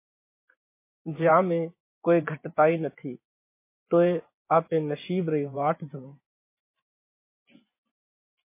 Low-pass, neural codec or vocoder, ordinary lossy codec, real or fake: 3.6 kHz; vocoder, 24 kHz, 100 mel bands, Vocos; MP3, 24 kbps; fake